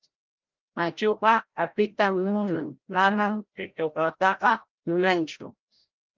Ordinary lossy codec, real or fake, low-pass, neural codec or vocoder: Opus, 32 kbps; fake; 7.2 kHz; codec, 16 kHz, 0.5 kbps, FreqCodec, larger model